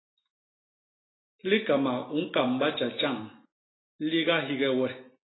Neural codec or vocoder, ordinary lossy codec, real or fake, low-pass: none; AAC, 16 kbps; real; 7.2 kHz